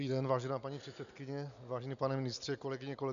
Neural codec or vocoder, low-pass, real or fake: none; 7.2 kHz; real